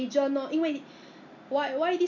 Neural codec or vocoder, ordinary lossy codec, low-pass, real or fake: none; none; 7.2 kHz; real